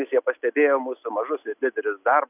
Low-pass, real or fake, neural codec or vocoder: 3.6 kHz; real; none